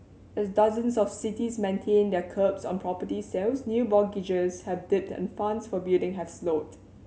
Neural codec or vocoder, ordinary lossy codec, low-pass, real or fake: none; none; none; real